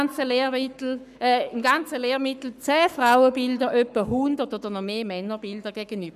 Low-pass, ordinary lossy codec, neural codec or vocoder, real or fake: 14.4 kHz; none; codec, 44.1 kHz, 7.8 kbps, Pupu-Codec; fake